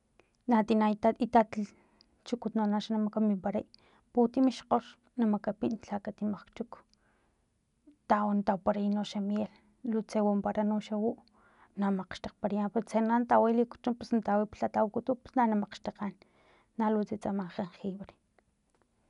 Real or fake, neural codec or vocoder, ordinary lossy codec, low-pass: real; none; none; 10.8 kHz